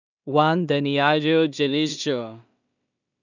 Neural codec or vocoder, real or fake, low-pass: codec, 16 kHz in and 24 kHz out, 0.4 kbps, LongCat-Audio-Codec, two codebook decoder; fake; 7.2 kHz